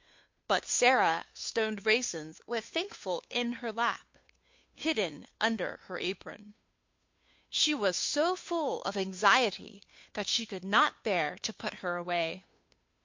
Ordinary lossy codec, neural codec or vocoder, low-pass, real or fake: MP3, 48 kbps; codec, 16 kHz, 2 kbps, FunCodec, trained on Chinese and English, 25 frames a second; 7.2 kHz; fake